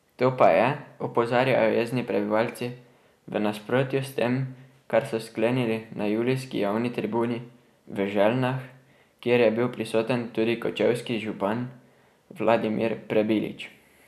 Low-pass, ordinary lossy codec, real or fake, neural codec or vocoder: 14.4 kHz; none; real; none